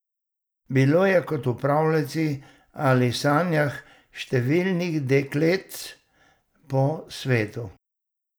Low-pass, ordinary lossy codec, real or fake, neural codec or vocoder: none; none; real; none